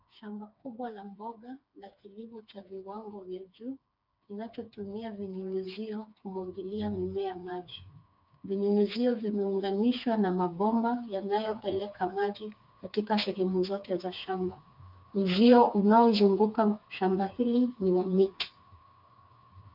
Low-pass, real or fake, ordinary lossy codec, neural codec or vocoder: 5.4 kHz; fake; MP3, 48 kbps; codec, 16 kHz, 4 kbps, FreqCodec, smaller model